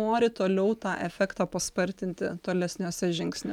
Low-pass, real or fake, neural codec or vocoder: 19.8 kHz; real; none